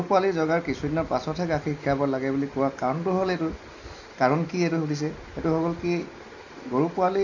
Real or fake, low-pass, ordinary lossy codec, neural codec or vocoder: real; 7.2 kHz; none; none